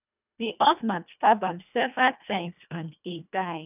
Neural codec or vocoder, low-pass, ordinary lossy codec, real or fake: codec, 24 kHz, 1.5 kbps, HILCodec; 3.6 kHz; none; fake